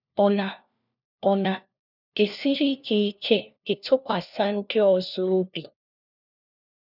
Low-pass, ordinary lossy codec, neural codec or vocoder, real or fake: 5.4 kHz; none; codec, 16 kHz, 1 kbps, FunCodec, trained on LibriTTS, 50 frames a second; fake